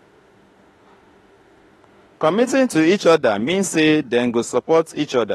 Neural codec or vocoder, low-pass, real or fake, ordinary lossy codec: autoencoder, 48 kHz, 32 numbers a frame, DAC-VAE, trained on Japanese speech; 19.8 kHz; fake; AAC, 32 kbps